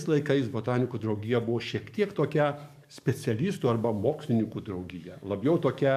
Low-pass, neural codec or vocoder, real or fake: 14.4 kHz; codec, 44.1 kHz, 7.8 kbps, DAC; fake